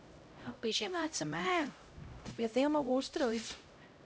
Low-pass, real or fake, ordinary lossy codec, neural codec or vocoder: none; fake; none; codec, 16 kHz, 0.5 kbps, X-Codec, HuBERT features, trained on LibriSpeech